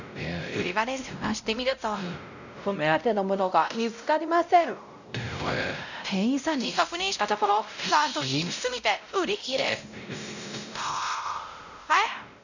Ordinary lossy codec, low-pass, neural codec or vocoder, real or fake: none; 7.2 kHz; codec, 16 kHz, 0.5 kbps, X-Codec, WavLM features, trained on Multilingual LibriSpeech; fake